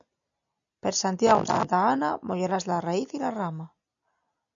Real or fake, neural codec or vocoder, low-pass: real; none; 7.2 kHz